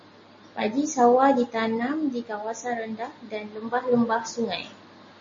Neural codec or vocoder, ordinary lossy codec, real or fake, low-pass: none; MP3, 32 kbps; real; 7.2 kHz